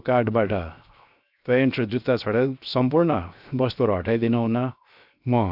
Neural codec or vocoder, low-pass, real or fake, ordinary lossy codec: codec, 16 kHz, 0.7 kbps, FocalCodec; 5.4 kHz; fake; none